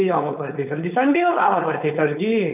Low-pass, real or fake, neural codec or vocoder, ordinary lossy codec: 3.6 kHz; fake; codec, 16 kHz, 4.8 kbps, FACodec; none